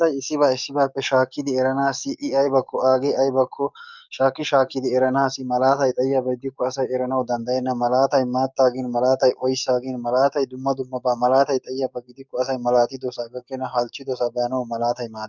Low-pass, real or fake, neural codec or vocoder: 7.2 kHz; fake; codec, 44.1 kHz, 7.8 kbps, DAC